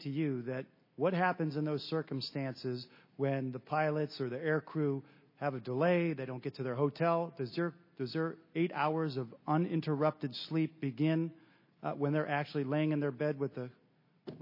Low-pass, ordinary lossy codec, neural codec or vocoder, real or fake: 5.4 kHz; MP3, 24 kbps; none; real